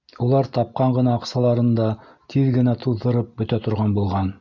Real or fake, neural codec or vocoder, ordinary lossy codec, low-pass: real; none; MP3, 64 kbps; 7.2 kHz